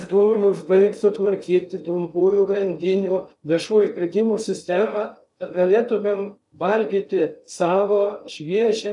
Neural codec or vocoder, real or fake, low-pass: codec, 16 kHz in and 24 kHz out, 0.6 kbps, FocalCodec, streaming, 2048 codes; fake; 10.8 kHz